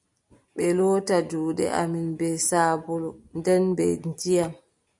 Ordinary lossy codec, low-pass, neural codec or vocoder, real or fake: MP3, 64 kbps; 10.8 kHz; none; real